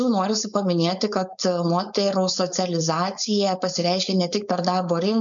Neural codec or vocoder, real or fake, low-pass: codec, 16 kHz, 4.8 kbps, FACodec; fake; 7.2 kHz